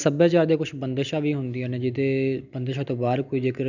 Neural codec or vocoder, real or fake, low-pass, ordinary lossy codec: none; real; 7.2 kHz; none